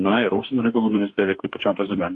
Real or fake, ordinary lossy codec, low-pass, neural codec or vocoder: fake; AAC, 48 kbps; 10.8 kHz; codec, 44.1 kHz, 2.6 kbps, DAC